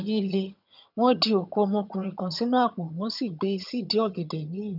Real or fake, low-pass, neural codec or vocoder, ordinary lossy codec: fake; 5.4 kHz; vocoder, 22.05 kHz, 80 mel bands, HiFi-GAN; none